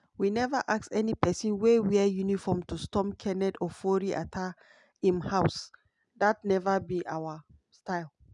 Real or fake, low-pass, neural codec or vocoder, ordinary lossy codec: real; 10.8 kHz; none; none